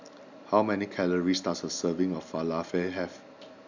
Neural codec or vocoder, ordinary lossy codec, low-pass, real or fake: none; none; 7.2 kHz; real